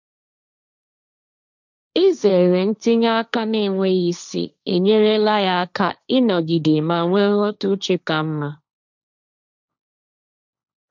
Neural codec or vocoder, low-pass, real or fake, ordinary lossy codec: codec, 16 kHz, 1.1 kbps, Voila-Tokenizer; 7.2 kHz; fake; none